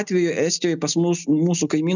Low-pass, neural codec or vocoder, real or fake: 7.2 kHz; none; real